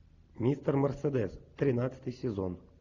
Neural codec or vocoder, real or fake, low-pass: none; real; 7.2 kHz